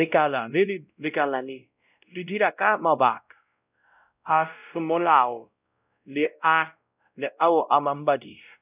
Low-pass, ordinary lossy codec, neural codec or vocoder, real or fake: 3.6 kHz; none; codec, 16 kHz, 0.5 kbps, X-Codec, WavLM features, trained on Multilingual LibriSpeech; fake